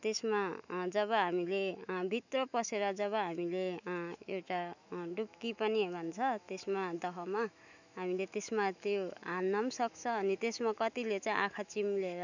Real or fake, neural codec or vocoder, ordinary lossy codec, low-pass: fake; autoencoder, 48 kHz, 128 numbers a frame, DAC-VAE, trained on Japanese speech; none; 7.2 kHz